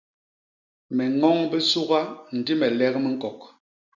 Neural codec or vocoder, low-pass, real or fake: none; 7.2 kHz; real